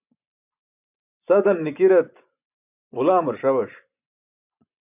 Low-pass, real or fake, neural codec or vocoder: 3.6 kHz; fake; vocoder, 24 kHz, 100 mel bands, Vocos